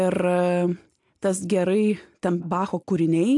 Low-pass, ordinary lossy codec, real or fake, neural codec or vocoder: 10.8 kHz; AAC, 64 kbps; real; none